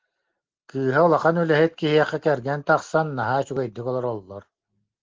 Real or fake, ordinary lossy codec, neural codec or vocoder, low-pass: real; Opus, 16 kbps; none; 7.2 kHz